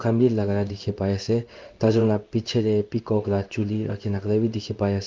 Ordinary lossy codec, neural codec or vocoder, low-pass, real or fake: Opus, 24 kbps; codec, 16 kHz in and 24 kHz out, 1 kbps, XY-Tokenizer; 7.2 kHz; fake